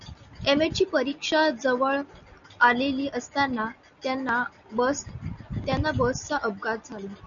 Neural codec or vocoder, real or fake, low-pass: none; real; 7.2 kHz